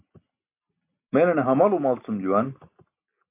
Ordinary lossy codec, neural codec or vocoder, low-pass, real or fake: MP3, 24 kbps; none; 3.6 kHz; real